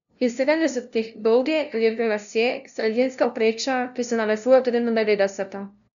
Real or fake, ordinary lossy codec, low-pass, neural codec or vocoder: fake; none; 7.2 kHz; codec, 16 kHz, 0.5 kbps, FunCodec, trained on LibriTTS, 25 frames a second